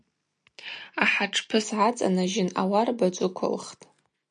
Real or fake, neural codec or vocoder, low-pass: real; none; 9.9 kHz